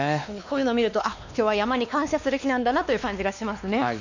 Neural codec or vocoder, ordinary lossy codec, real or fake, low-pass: codec, 16 kHz, 2 kbps, X-Codec, WavLM features, trained on Multilingual LibriSpeech; none; fake; 7.2 kHz